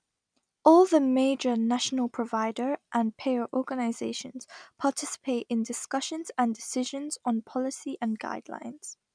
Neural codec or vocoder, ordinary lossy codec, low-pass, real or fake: none; none; 9.9 kHz; real